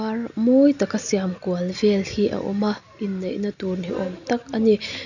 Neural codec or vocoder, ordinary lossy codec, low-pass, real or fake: none; none; 7.2 kHz; real